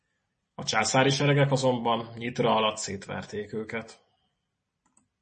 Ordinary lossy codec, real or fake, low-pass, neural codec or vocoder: MP3, 32 kbps; real; 10.8 kHz; none